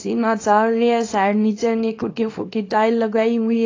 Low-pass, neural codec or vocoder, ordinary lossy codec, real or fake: 7.2 kHz; codec, 24 kHz, 0.9 kbps, WavTokenizer, small release; AAC, 32 kbps; fake